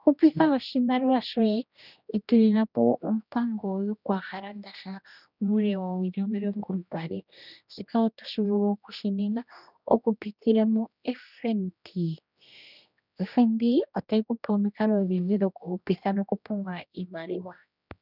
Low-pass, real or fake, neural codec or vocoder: 5.4 kHz; fake; codec, 16 kHz, 1 kbps, X-Codec, HuBERT features, trained on general audio